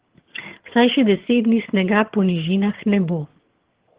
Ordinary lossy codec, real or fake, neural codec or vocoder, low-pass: Opus, 16 kbps; fake; vocoder, 22.05 kHz, 80 mel bands, HiFi-GAN; 3.6 kHz